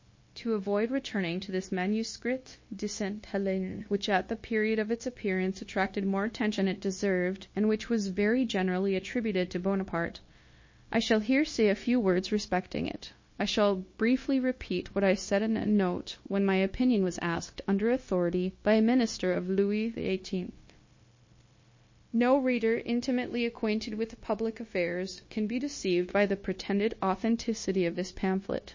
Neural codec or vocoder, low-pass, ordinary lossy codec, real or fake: codec, 16 kHz, 0.9 kbps, LongCat-Audio-Codec; 7.2 kHz; MP3, 32 kbps; fake